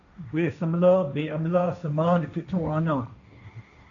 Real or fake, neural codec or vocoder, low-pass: fake; codec, 16 kHz, 1.1 kbps, Voila-Tokenizer; 7.2 kHz